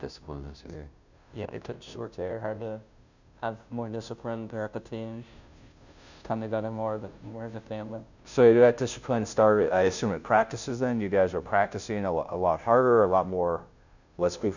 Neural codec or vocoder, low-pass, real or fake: codec, 16 kHz, 0.5 kbps, FunCodec, trained on Chinese and English, 25 frames a second; 7.2 kHz; fake